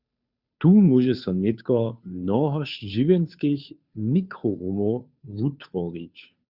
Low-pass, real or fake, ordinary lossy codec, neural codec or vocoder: 5.4 kHz; fake; Opus, 64 kbps; codec, 16 kHz, 2 kbps, FunCodec, trained on Chinese and English, 25 frames a second